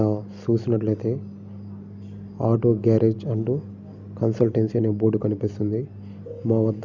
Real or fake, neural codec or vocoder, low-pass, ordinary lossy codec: real; none; 7.2 kHz; none